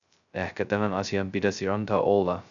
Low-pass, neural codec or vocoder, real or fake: 7.2 kHz; codec, 16 kHz, 0.2 kbps, FocalCodec; fake